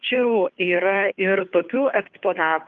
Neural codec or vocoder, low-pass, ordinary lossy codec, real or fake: codec, 16 kHz, 2 kbps, FunCodec, trained on Chinese and English, 25 frames a second; 7.2 kHz; Opus, 32 kbps; fake